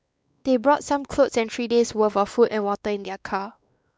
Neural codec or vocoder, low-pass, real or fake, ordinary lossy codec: codec, 16 kHz, 2 kbps, X-Codec, WavLM features, trained on Multilingual LibriSpeech; none; fake; none